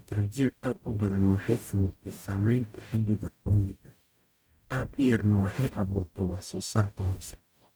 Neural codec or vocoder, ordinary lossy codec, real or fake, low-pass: codec, 44.1 kHz, 0.9 kbps, DAC; none; fake; none